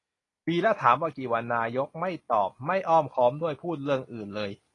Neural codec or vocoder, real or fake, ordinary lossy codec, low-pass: none; real; AAC, 32 kbps; 10.8 kHz